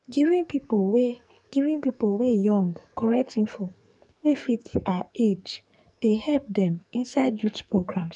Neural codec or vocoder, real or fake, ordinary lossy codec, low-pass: codec, 44.1 kHz, 2.6 kbps, SNAC; fake; none; 10.8 kHz